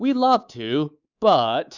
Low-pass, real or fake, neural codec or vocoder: 7.2 kHz; fake; codec, 24 kHz, 3.1 kbps, DualCodec